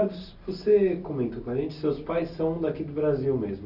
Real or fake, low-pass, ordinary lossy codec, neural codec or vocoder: real; 5.4 kHz; none; none